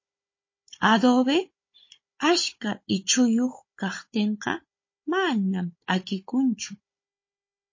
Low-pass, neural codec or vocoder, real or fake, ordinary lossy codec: 7.2 kHz; codec, 16 kHz, 16 kbps, FunCodec, trained on Chinese and English, 50 frames a second; fake; MP3, 32 kbps